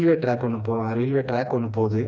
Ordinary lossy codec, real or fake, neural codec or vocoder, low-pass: none; fake; codec, 16 kHz, 2 kbps, FreqCodec, smaller model; none